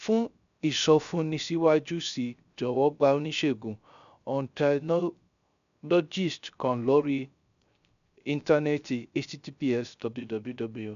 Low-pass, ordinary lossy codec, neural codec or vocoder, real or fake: 7.2 kHz; none; codec, 16 kHz, 0.3 kbps, FocalCodec; fake